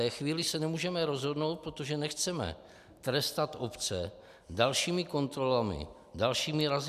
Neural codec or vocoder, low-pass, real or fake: none; 14.4 kHz; real